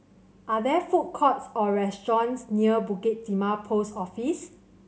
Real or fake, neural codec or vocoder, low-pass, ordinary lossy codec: real; none; none; none